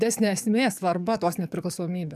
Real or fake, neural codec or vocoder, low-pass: fake; codec, 44.1 kHz, 7.8 kbps, DAC; 14.4 kHz